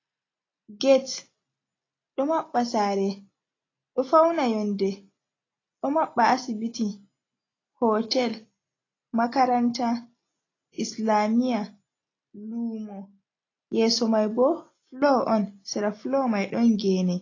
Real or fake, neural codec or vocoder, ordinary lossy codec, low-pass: real; none; AAC, 32 kbps; 7.2 kHz